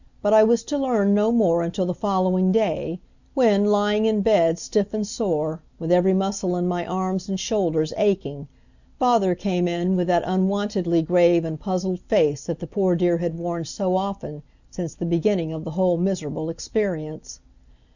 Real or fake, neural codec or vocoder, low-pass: real; none; 7.2 kHz